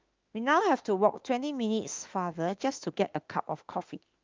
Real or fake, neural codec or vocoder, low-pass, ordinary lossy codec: fake; autoencoder, 48 kHz, 32 numbers a frame, DAC-VAE, trained on Japanese speech; 7.2 kHz; Opus, 24 kbps